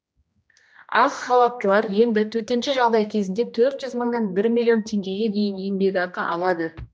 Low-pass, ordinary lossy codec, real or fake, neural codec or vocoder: none; none; fake; codec, 16 kHz, 1 kbps, X-Codec, HuBERT features, trained on general audio